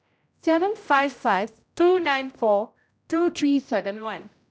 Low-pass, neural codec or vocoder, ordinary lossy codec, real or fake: none; codec, 16 kHz, 0.5 kbps, X-Codec, HuBERT features, trained on general audio; none; fake